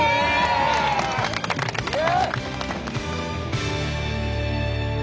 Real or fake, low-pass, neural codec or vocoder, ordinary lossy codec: real; none; none; none